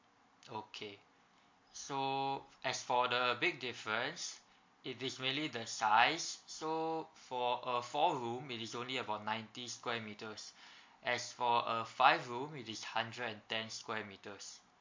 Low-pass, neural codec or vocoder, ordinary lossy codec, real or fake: 7.2 kHz; none; MP3, 48 kbps; real